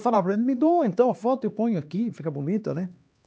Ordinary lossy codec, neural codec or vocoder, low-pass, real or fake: none; codec, 16 kHz, 2 kbps, X-Codec, HuBERT features, trained on LibriSpeech; none; fake